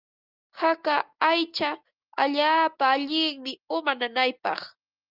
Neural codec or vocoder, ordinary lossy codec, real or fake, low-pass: none; Opus, 24 kbps; real; 5.4 kHz